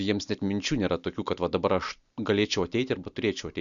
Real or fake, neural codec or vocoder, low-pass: real; none; 7.2 kHz